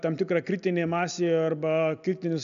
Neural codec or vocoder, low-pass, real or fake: none; 7.2 kHz; real